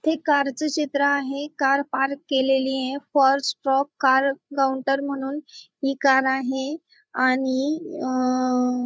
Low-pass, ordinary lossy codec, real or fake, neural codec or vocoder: none; none; fake; codec, 16 kHz, 8 kbps, FreqCodec, larger model